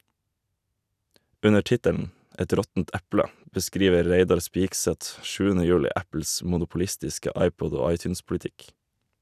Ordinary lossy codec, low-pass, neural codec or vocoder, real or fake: none; 14.4 kHz; none; real